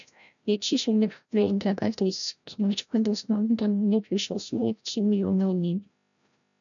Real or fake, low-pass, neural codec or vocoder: fake; 7.2 kHz; codec, 16 kHz, 0.5 kbps, FreqCodec, larger model